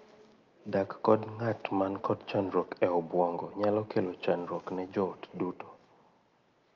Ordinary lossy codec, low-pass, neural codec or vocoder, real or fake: Opus, 24 kbps; 7.2 kHz; none; real